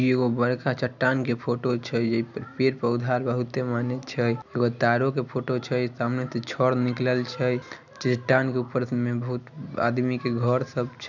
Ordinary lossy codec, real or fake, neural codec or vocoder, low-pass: none; real; none; 7.2 kHz